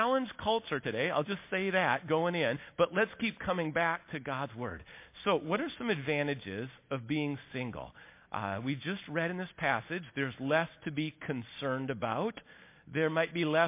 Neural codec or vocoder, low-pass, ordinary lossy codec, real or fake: none; 3.6 kHz; MP3, 24 kbps; real